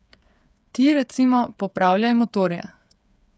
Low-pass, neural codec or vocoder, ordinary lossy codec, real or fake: none; codec, 16 kHz, 8 kbps, FreqCodec, smaller model; none; fake